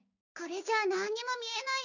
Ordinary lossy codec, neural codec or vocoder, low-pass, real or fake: none; codec, 16 kHz, 6 kbps, DAC; 7.2 kHz; fake